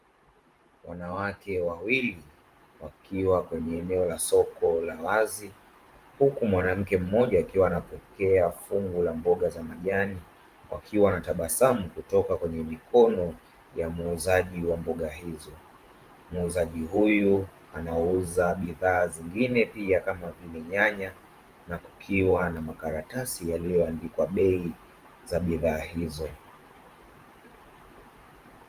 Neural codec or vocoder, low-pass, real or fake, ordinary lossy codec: vocoder, 44.1 kHz, 128 mel bands every 512 samples, BigVGAN v2; 14.4 kHz; fake; Opus, 32 kbps